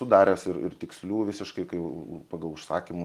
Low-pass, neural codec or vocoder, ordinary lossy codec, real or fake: 14.4 kHz; none; Opus, 24 kbps; real